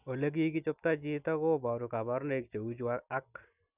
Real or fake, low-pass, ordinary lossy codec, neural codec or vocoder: real; 3.6 kHz; none; none